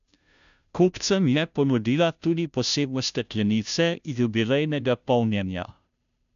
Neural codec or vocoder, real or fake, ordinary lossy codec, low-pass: codec, 16 kHz, 0.5 kbps, FunCodec, trained on Chinese and English, 25 frames a second; fake; none; 7.2 kHz